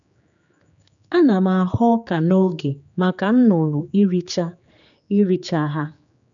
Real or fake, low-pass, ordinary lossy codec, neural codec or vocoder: fake; 7.2 kHz; none; codec, 16 kHz, 4 kbps, X-Codec, HuBERT features, trained on general audio